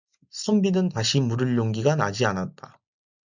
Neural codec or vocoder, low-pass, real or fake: none; 7.2 kHz; real